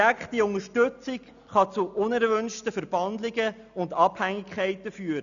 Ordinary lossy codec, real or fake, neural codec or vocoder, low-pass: none; real; none; 7.2 kHz